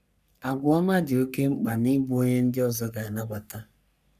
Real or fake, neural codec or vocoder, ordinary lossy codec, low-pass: fake; codec, 44.1 kHz, 3.4 kbps, Pupu-Codec; none; 14.4 kHz